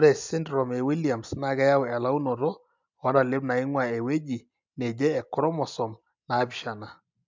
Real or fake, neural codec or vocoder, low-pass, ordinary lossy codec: real; none; 7.2 kHz; MP3, 64 kbps